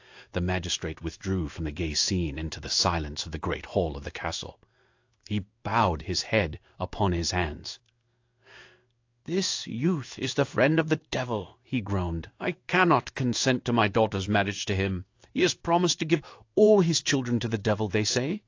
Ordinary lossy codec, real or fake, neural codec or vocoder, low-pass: AAC, 48 kbps; fake; codec, 16 kHz in and 24 kHz out, 1 kbps, XY-Tokenizer; 7.2 kHz